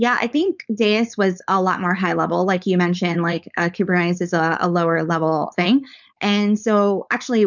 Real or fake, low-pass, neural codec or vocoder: fake; 7.2 kHz; codec, 16 kHz, 4.8 kbps, FACodec